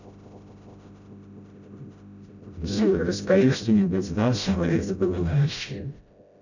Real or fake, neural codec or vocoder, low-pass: fake; codec, 16 kHz, 0.5 kbps, FreqCodec, smaller model; 7.2 kHz